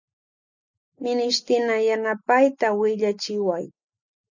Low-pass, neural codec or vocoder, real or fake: 7.2 kHz; none; real